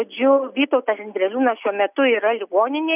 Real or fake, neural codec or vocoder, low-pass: real; none; 3.6 kHz